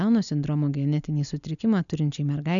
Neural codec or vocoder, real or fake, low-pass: none; real; 7.2 kHz